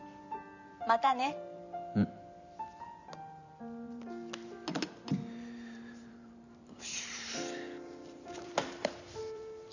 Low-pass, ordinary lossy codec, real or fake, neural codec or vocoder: 7.2 kHz; none; fake; vocoder, 44.1 kHz, 128 mel bands every 512 samples, BigVGAN v2